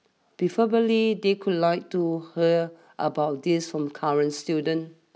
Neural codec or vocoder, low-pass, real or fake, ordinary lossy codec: none; none; real; none